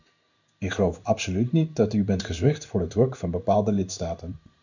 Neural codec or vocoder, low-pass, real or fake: codec, 16 kHz in and 24 kHz out, 1 kbps, XY-Tokenizer; 7.2 kHz; fake